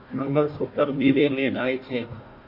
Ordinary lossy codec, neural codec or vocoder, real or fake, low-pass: MP3, 32 kbps; codec, 16 kHz, 1 kbps, FunCodec, trained on Chinese and English, 50 frames a second; fake; 5.4 kHz